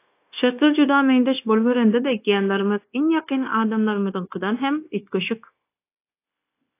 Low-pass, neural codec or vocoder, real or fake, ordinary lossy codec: 3.6 kHz; codec, 16 kHz, 0.9 kbps, LongCat-Audio-Codec; fake; AAC, 24 kbps